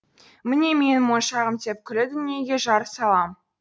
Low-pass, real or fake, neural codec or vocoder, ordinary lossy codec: none; real; none; none